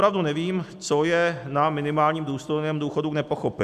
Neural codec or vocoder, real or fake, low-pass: none; real; 14.4 kHz